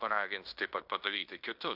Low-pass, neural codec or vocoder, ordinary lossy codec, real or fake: 5.4 kHz; codec, 16 kHz in and 24 kHz out, 0.9 kbps, LongCat-Audio-Codec, fine tuned four codebook decoder; AAC, 48 kbps; fake